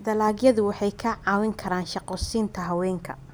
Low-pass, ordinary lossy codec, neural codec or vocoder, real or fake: none; none; none; real